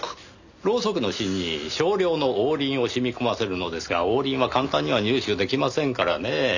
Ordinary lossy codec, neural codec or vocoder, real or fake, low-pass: none; none; real; 7.2 kHz